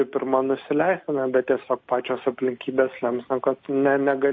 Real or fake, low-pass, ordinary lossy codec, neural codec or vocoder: real; 7.2 kHz; MP3, 32 kbps; none